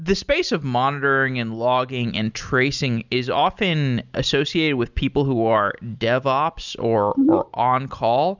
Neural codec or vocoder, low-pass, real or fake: none; 7.2 kHz; real